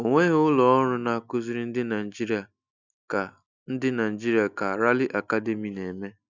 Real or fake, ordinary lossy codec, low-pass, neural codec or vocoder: real; none; 7.2 kHz; none